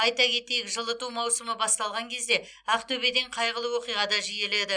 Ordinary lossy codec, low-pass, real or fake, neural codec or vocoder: none; 9.9 kHz; real; none